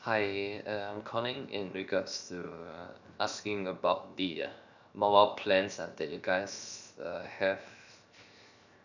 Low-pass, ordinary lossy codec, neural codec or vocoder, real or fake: 7.2 kHz; none; codec, 16 kHz, 0.7 kbps, FocalCodec; fake